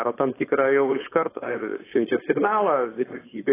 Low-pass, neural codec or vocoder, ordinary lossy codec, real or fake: 3.6 kHz; codec, 16 kHz, 4.8 kbps, FACodec; AAC, 16 kbps; fake